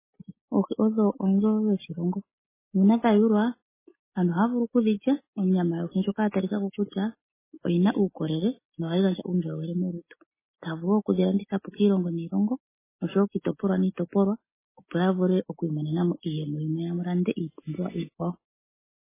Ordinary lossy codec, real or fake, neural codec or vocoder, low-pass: MP3, 16 kbps; real; none; 3.6 kHz